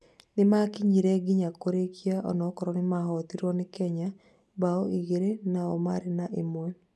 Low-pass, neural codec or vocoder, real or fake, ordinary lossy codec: none; none; real; none